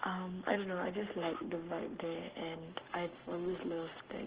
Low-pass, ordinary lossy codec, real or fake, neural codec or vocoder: 3.6 kHz; Opus, 16 kbps; fake; codec, 44.1 kHz, 7.8 kbps, Pupu-Codec